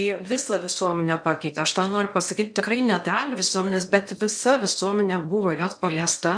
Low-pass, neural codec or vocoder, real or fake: 9.9 kHz; codec, 16 kHz in and 24 kHz out, 0.8 kbps, FocalCodec, streaming, 65536 codes; fake